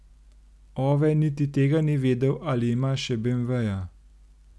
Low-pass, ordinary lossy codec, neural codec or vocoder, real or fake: none; none; none; real